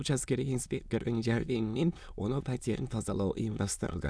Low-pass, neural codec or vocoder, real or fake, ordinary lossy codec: none; autoencoder, 22.05 kHz, a latent of 192 numbers a frame, VITS, trained on many speakers; fake; none